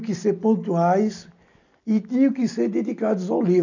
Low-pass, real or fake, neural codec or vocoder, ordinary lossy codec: 7.2 kHz; real; none; none